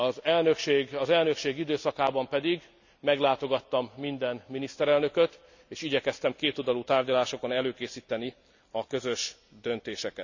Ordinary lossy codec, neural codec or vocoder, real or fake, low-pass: none; none; real; 7.2 kHz